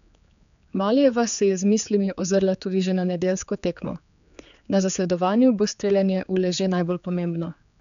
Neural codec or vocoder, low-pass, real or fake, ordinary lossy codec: codec, 16 kHz, 4 kbps, X-Codec, HuBERT features, trained on general audio; 7.2 kHz; fake; none